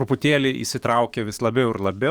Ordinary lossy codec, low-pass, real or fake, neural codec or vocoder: Opus, 64 kbps; 19.8 kHz; fake; codec, 44.1 kHz, 7.8 kbps, DAC